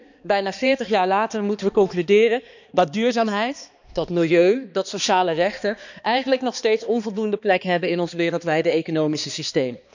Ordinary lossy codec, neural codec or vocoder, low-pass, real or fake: none; codec, 16 kHz, 2 kbps, X-Codec, HuBERT features, trained on balanced general audio; 7.2 kHz; fake